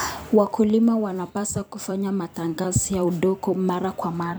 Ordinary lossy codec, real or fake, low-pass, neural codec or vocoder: none; fake; none; vocoder, 44.1 kHz, 128 mel bands every 256 samples, BigVGAN v2